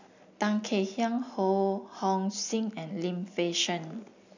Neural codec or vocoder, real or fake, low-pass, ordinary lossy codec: none; real; 7.2 kHz; none